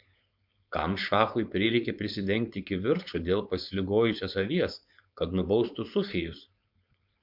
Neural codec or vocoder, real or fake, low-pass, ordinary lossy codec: codec, 16 kHz, 4.8 kbps, FACodec; fake; 5.4 kHz; MP3, 48 kbps